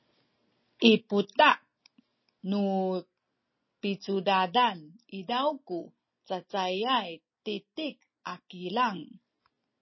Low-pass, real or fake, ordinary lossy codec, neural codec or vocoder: 7.2 kHz; real; MP3, 24 kbps; none